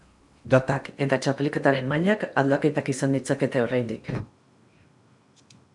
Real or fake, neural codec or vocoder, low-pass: fake; codec, 16 kHz in and 24 kHz out, 0.8 kbps, FocalCodec, streaming, 65536 codes; 10.8 kHz